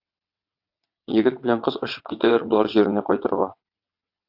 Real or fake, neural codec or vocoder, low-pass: fake; vocoder, 22.05 kHz, 80 mel bands, WaveNeXt; 5.4 kHz